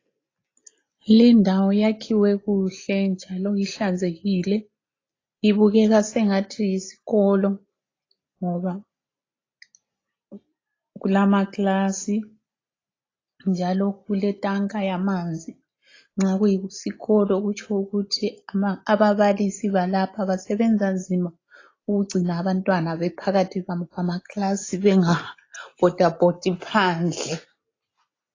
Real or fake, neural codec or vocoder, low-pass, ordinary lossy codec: real; none; 7.2 kHz; AAC, 32 kbps